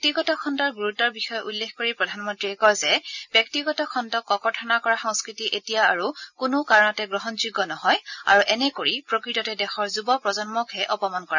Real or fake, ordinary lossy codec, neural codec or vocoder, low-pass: real; none; none; 7.2 kHz